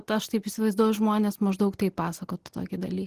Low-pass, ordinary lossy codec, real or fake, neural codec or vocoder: 14.4 kHz; Opus, 16 kbps; real; none